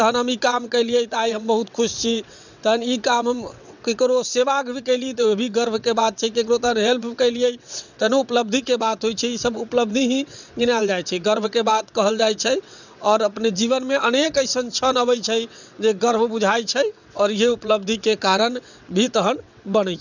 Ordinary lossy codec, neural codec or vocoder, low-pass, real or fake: none; vocoder, 22.05 kHz, 80 mel bands, WaveNeXt; 7.2 kHz; fake